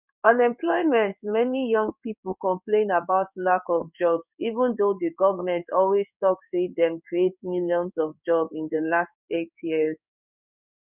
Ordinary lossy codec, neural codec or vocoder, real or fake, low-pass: none; codec, 16 kHz in and 24 kHz out, 1 kbps, XY-Tokenizer; fake; 3.6 kHz